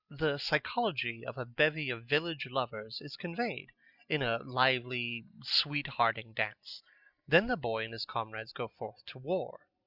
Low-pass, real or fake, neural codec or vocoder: 5.4 kHz; real; none